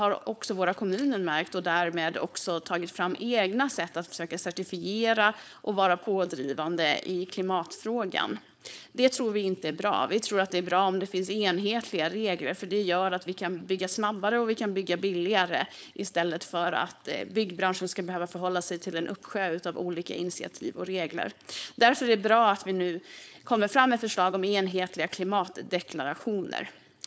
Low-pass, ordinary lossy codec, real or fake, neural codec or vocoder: none; none; fake; codec, 16 kHz, 4.8 kbps, FACodec